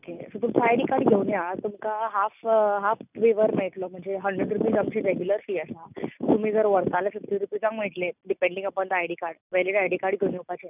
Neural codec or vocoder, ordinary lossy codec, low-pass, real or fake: none; none; 3.6 kHz; real